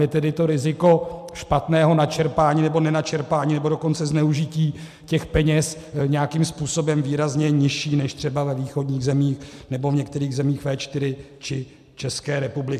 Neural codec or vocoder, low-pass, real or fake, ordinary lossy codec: none; 14.4 kHz; real; MP3, 96 kbps